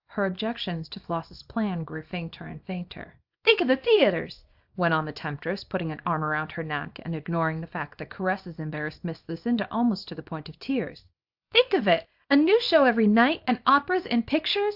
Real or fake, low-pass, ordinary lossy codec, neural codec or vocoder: fake; 5.4 kHz; AAC, 48 kbps; codec, 24 kHz, 0.9 kbps, WavTokenizer, small release